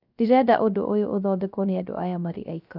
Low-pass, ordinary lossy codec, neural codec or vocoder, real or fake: 5.4 kHz; none; codec, 16 kHz, 0.3 kbps, FocalCodec; fake